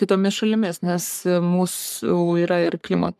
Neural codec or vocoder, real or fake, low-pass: codec, 44.1 kHz, 3.4 kbps, Pupu-Codec; fake; 14.4 kHz